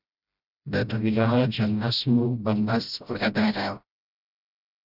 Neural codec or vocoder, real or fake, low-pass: codec, 16 kHz, 0.5 kbps, FreqCodec, smaller model; fake; 5.4 kHz